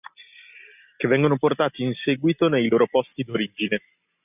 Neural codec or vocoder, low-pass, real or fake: none; 3.6 kHz; real